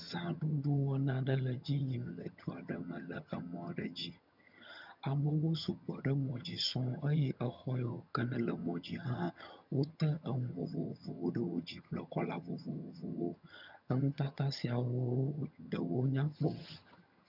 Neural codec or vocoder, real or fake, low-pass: vocoder, 22.05 kHz, 80 mel bands, HiFi-GAN; fake; 5.4 kHz